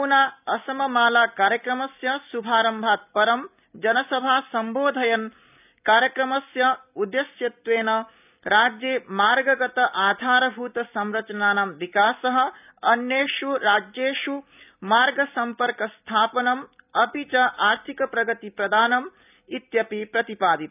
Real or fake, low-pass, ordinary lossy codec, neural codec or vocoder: real; 3.6 kHz; none; none